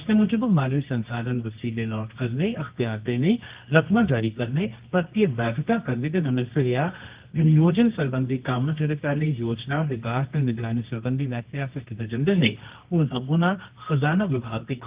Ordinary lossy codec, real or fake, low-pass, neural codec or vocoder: Opus, 32 kbps; fake; 3.6 kHz; codec, 24 kHz, 0.9 kbps, WavTokenizer, medium music audio release